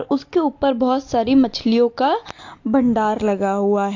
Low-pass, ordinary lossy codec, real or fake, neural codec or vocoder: 7.2 kHz; AAC, 48 kbps; real; none